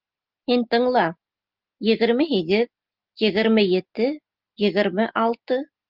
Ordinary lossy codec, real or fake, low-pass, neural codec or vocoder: Opus, 24 kbps; real; 5.4 kHz; none